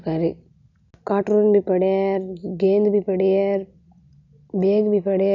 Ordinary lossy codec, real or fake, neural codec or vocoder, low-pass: none; real; none; 7.2 kHz